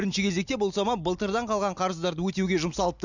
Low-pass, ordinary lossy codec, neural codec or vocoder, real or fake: 7.2 kHz; none; none; real